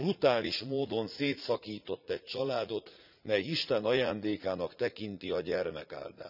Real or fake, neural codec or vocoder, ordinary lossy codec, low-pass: fake; vocoder, 44.1 kHz, 80 mel bands, Vocos; none; 5.4 kHz